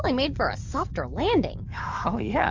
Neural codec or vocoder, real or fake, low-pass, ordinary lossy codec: codec, 44.1 kHz, 7.8 kbps, DAC; fake; 7.2 kHz; Opus, 32 kbps